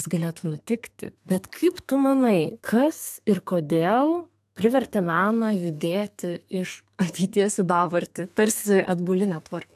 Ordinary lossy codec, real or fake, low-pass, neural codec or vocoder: AAC, 96 kbps; fake; 14.4 kHz; codec, 32 kHz, 1.9 kbps, SNAC